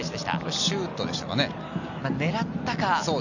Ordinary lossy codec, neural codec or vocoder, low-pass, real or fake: none; none; 7.2 kHz; real